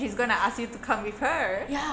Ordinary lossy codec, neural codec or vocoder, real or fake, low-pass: none; none; real; none